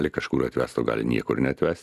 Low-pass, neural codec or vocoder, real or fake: 14.4 kHz; vocoder, 44.1 kHz, 128 mel bands every 256 samples, BigVGAN v2; fake